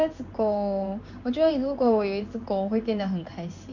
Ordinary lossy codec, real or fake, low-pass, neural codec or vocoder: none; fake; 7.2 kHz; codec, 16 kHz in and 24 kHz out, 1 kbps, XY-Tokenizer